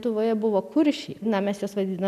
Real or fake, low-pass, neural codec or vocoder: real; 14.4 kHz; none